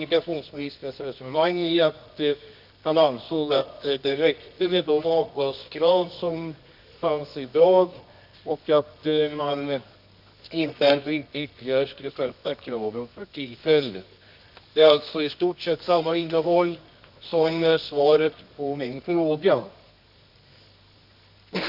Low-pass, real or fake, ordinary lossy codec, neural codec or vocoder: 5.4 kHz; fake; none; codec, 24 kHz, 0.9 kbps, WavTokenizer, medium music audio release